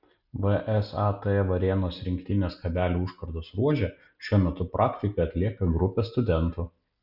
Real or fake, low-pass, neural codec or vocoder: real; 5.4 kHz; none